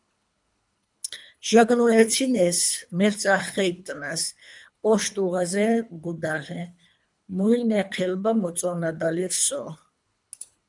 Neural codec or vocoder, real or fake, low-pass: codec, 24 kHz, 3 kbps, HILCodec; fake; 10.8 kHz